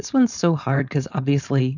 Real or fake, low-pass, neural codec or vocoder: fake; 7.2 kHz; codec, 16 kHz, 4.8 kbps, FACodec